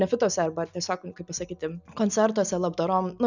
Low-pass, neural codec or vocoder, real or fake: 7.2 kHz; none; real